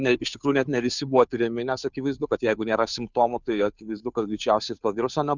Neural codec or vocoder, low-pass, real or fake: codec, 16 kHz, 2 kbps, FunCodec, trained on Chinese and English, 25 frames a second; 7.2 kHz; fake